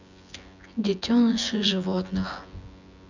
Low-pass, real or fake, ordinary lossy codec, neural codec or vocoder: 7.2 kHz; fake; none; vocoder, 24 kHz, 100 mel bands, Vocos